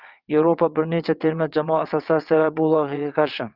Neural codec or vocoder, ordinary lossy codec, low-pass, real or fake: vocoder, 22.05 kHz, 80 mel bands, WaveNeXt; Opus, 32 kbps; 5.4 kHz; fake